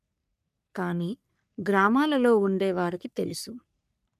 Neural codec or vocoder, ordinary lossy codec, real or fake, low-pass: codec, 44.1 kHz, 3.4 kbps, Pupu-Codec; none; fake; 14.4 kHz